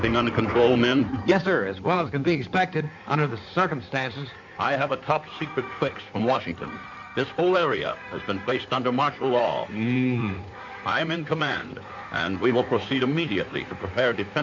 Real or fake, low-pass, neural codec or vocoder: fake; 7.2 kHz; codec, 16 kHz in and 24 kHz out, 2.2 kbps, FireRedTTS-2 codec